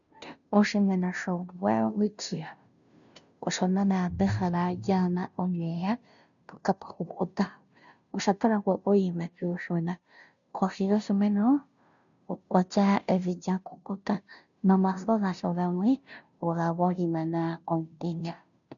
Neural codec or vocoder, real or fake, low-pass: codec, 16 kHz, 0.5 kbps, FunCodec, trained on Chinese and English, 25 frames a second; fake; 7.2 kHz